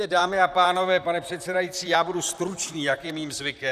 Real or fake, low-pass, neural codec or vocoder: fake; 14.4 kHz; vocoder, 44.1 kHz, 128 mel bands, Pupu-Vocoder